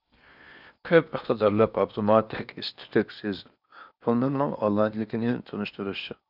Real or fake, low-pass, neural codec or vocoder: fake; 5.4 kHz; codec, 16 kHz in and 24 kHz out, 0.8 kbps, FocalCodec, streaming, 65536 codes